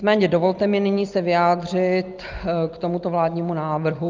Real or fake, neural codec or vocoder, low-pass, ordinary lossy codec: real; none; 7.2 kHz; Opus, 32 kbps